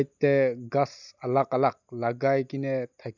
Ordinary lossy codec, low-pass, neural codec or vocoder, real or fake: none; 7.2 kHz; none; real